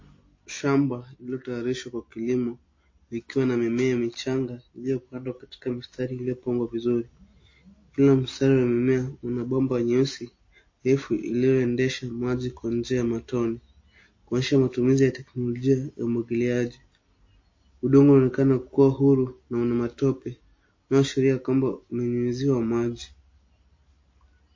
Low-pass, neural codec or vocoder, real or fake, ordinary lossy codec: 7.2 kHz; none; real; MP3, 32 kbps